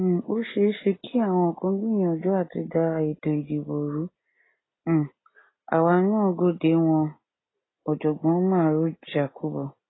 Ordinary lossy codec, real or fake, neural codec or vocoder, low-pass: AAC, 16 kbps; real; none; 7.2 kHz